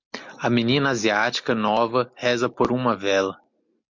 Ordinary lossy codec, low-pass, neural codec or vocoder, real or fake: MP3, 48 kbps; 7.2 kHz; none; real